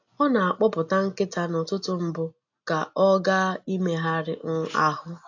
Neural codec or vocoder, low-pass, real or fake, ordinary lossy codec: none; 7.2 kHz; real; AAC, 48 kbps